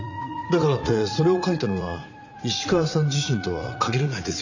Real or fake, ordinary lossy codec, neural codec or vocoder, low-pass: fake; none; vocoder, 44.1 kHz, 80 mel bands, Vocos; 7.2 kHz